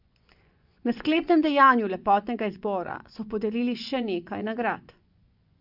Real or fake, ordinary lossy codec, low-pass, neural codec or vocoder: fake; AAC, 48 kbps; 5.4 kHz; vocoder, 24 kHz, 100 mel bands, Vocos